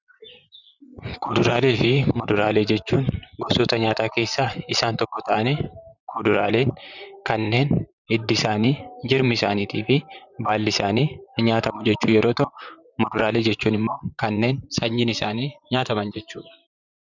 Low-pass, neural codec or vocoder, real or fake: 7.2 kHz; vocoder, 44.1 kHz, 128 mel bands, Pupu-Vocoder; fake